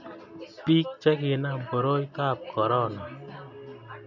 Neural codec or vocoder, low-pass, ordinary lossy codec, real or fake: none; 7.2 kHz; none; real